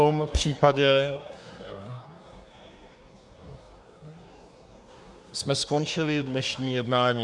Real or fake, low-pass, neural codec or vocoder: fake; 10.8 kHz; codec, 24 kHz, 1 kbps, SNAC